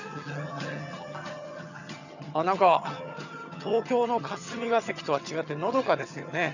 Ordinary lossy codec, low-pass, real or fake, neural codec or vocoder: none; 7.2 kHz; fake; vocoder, 22.05 kHz, 80 mel bands, HiFi-GAN